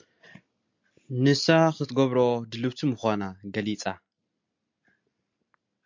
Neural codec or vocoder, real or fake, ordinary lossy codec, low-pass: none; real; MP3, 64 kbps; 7.2 kHz